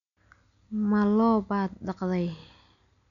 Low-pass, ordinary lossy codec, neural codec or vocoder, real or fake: 7.2 kHz; none; none; real